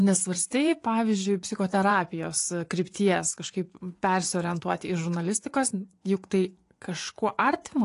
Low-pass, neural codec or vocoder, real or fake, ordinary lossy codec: 10.8 kHz; vocoder, 24 kHz, 100 mel bands, Vocos; fake; AAC, 48 kbps